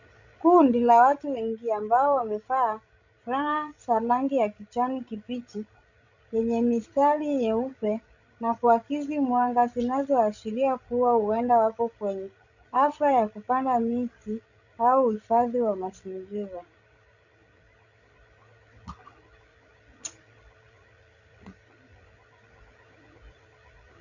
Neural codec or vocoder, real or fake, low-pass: codec, 16 kHz, 16 kbps, FreqCodec, larger model; fake; 7.2 kHz